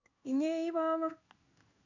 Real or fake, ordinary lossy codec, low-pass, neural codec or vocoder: fake; none; 7.2 kHz; codec, 16 kHz in and 24 kHz out, 1 kbps, XY-Tokenizer